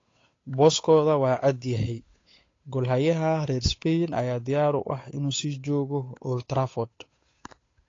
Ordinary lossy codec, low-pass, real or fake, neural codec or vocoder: AAC, 32 kbps; 7.2 kHz; fake; codec, 16 kHz, 8 kbps, FunCodec, trained on Chinese and English, 25 frames a second